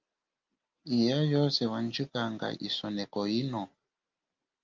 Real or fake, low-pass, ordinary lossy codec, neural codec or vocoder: real; 7.2 kHz; Opus, 24 kbps; none